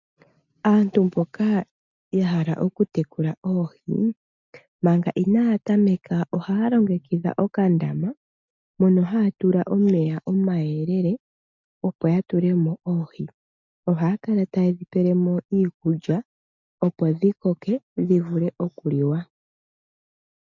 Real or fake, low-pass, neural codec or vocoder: real; 7.2 kHz; none